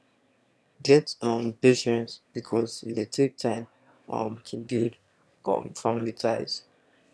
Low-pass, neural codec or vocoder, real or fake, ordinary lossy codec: none; autoencoder, 22.05 kHz, a latent of 192 numbers a frame, VITS, trained on one speaker; fake; none